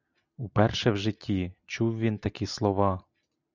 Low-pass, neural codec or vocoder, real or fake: 7.2 kHz; none; real